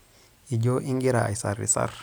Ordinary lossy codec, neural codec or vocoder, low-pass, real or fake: none; none; none; real